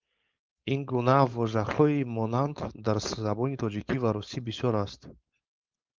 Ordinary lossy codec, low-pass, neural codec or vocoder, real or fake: Opus, 24 kbps; 7.2 kHz; codec, 16 kHz, 4.8 kbps, FACodec; fake